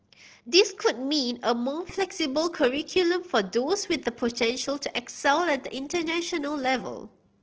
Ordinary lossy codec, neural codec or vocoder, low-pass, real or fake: Opus, 16 kbps; none; 7.2 kHz; real